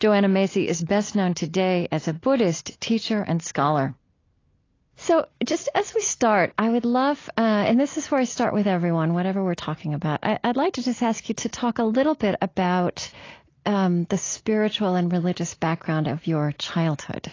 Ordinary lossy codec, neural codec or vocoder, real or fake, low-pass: AAC, 32 kbps; none; real; 7.2 kHz